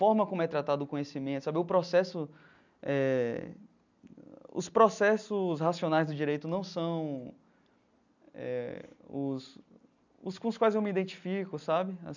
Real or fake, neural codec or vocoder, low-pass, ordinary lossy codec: real; none; 7.2 kHz; none